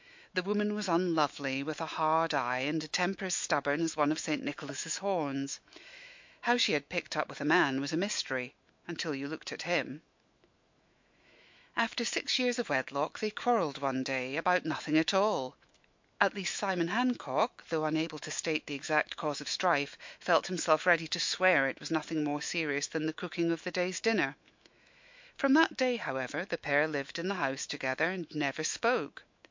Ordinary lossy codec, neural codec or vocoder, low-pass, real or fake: MP3, 48 kbps; autoencoder, 48 kHz, 128 numbers a frame, DAC-VAE, trained on Japanese speech; 7.2 kHz; fake